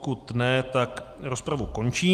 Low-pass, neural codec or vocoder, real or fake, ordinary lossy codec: 14.4 kHz; none; real; Opus, 32 kbps